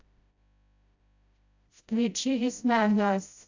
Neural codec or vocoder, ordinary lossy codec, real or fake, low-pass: codec, 16 kHz, 0.5 kbps, FreqCodec, smaller model; AAC, 48 kbps; fake; 7.2 kHz